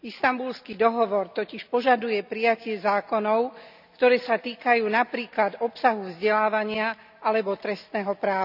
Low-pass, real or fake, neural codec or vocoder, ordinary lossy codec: 5.4 kHz; real; none; none